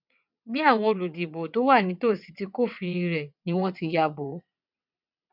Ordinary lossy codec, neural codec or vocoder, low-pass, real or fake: none; vocoder, 22.05 kHz, 80 mel bands, WaveNeXt; 5.4 kHz; fake